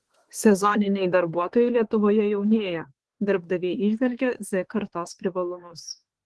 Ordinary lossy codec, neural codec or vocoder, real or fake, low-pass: Opus, 16 kbps; autoencoder, 48 kHz, 32 numbers a frame, DAC-VAE, trained on Japanese speech; fake; 10.8 kHz